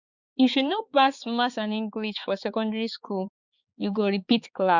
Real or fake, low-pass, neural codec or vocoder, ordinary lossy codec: fake; 7.2 kHz; codec, 16 kHz, 4 kbps, X-Codec, HuBERT features, trained on balanced general audio; Opus, 64 kbps